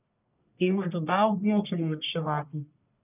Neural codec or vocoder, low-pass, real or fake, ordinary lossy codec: codec, 44.1 kHz, 1.7 kbps, Pupu-Codec; 3.6 kHz; fake; none